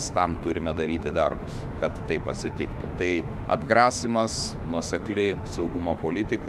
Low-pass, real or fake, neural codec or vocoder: 14.4 kHz; fake; autoencoder, 48 kHz, 32 numbers a frame, DAC-VAE, trained on Japanese speech